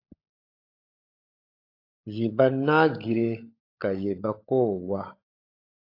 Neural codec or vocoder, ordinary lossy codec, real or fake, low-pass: codec, 16 kHz, 16 kbps, FunCodec, trained on LibriTTS, 50 frames a second; AAC, 32 kbps; fake; 5.4 kHz